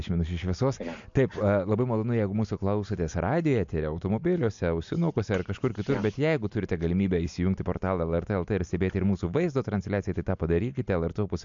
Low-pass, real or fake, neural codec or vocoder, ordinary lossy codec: 7.2 kHz; real; none; MP3, 64 kbps